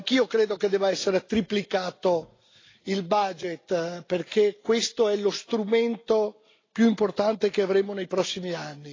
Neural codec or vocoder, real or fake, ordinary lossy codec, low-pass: none; real; AAC, 32 kbps; 7.2 kHz